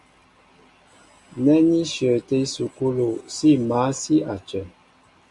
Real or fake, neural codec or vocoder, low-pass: real; none; 10.8 kHz